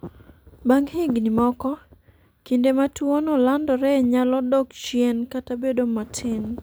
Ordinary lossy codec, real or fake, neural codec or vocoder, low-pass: none; real; none; none